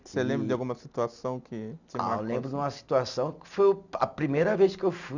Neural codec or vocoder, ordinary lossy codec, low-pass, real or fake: none; none; 7.2 kHz; real